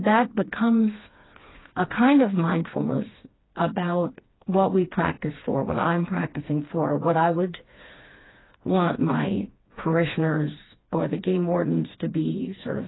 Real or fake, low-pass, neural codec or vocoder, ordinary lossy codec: fake; 7.2 kHz; codec, 16 kHz, 2 kbps, FreqCodec, smaller model; AAC, 16 kbps